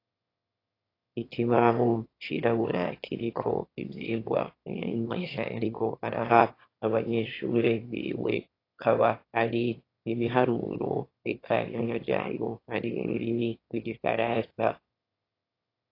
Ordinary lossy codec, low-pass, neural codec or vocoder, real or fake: AAC, 32 kbps; 5.4 kHz; autoencoder, 22.05 kHz, a latent of 192 numbers a frame, VITS, trained on one speaker; fake